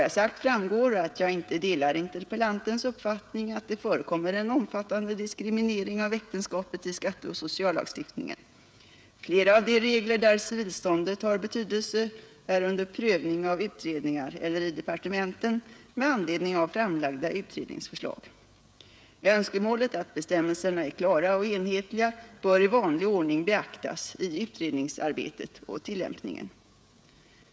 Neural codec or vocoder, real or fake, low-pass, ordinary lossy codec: codec, 16 kHz, 16 kbps, FreqCodec, smaller model; fake; none; none